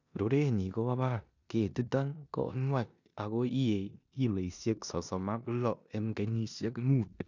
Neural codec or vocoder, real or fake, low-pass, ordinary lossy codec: codec, 16 kHz in and 24 kHz out, 0.9 kbps, LongCat-Audio-Codec, four codebook decoder; fake; 7.2 kHz; none